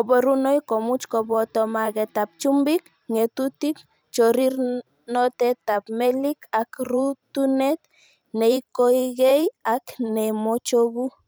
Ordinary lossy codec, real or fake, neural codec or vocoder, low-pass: none; fake; vocoder, 44.1 kHz, 128 mel bands every 512 samples, BigVGAN v2; none